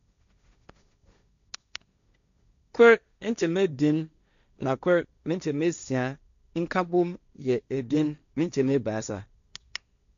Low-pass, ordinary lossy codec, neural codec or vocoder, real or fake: 7.2 kHz; none; codec, 16 kHz, 1.1 kbps, Voila-Tokenizer; fake